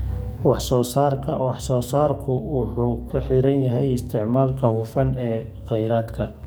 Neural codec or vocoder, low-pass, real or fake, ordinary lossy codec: codec, 44.1 kHz, 2.6 kbps, SNAC; none; fake; none